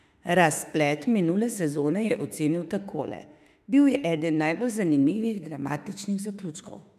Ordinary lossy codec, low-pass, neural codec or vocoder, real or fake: none; 14.4 kHz; autoencoder, 48 kHz, 32 numbers a frame, DAC-VAE, trained on Japanese speech; fake